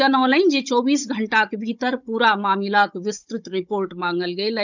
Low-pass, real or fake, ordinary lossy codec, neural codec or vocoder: 7.2 kHz; fake; none; codec, 16 kHz, 16 kbps, FunCodec, trained on Chinese and English, 50 frames a second